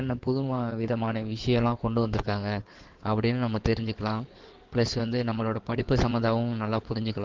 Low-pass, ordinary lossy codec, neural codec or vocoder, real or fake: 7.2 kHz; Opus, 16 kbps; codec, 16 kHz in and 24 kHz out, 2.2 kbps, FireRedTTS-2 codec; fake